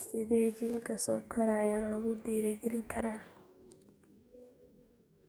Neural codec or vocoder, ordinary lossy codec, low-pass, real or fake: codec, 44.1 kHz, 2.6 kbps, DAC; none; none; fake